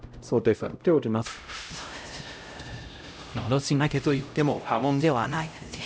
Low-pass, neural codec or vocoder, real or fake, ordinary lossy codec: none; codec, 16 kHz, 0.5 kbps, X-Codec, HuBERT features, trained on LibriSpeech; fake; none